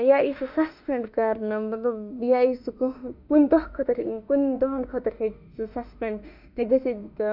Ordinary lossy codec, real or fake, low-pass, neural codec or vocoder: none; fake; 5.4 kHz; autoencoder, 48 kHz, 32 numbers a frame, DAC-VAE, trained on Japanese speech